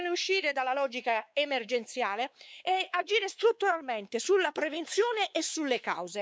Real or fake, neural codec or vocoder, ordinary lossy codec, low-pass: fake; codec, 16 kHz, 4 kbps, X-Codec, WavLM features, trained on Multilingual LibriSpeech; none; none